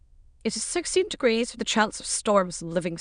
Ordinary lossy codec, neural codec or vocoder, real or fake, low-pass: none; autoencoder, 22.05 kHz, a latent of 192 numbers a frame, VITS, trained on many speakers; fake; 9.9 kHz